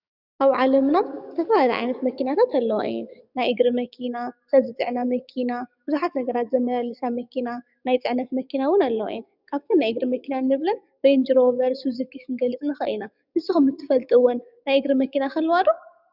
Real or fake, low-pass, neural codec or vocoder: fake; 5.4 kHz; codec, 44.1 kHz, 7.8 kbps, DAC